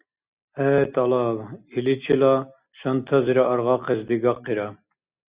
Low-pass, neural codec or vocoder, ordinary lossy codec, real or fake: 3.6 kHz; none; Opus, 64 kbps; real